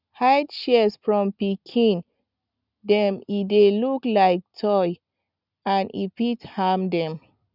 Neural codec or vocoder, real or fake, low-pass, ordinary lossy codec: none; real; 5.4 kHz; none